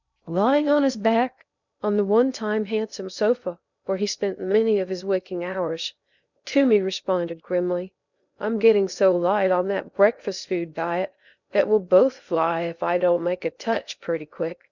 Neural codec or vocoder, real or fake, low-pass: codec, 16 kHz in and 24 kHz out, 0.6 kbps, FocalCodec, streaming, 2048 codes; fake; 7.2 kHz